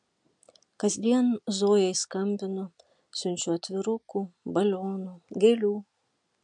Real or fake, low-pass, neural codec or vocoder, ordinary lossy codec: real; 9.9 kHz; none; MP3, 96 kbps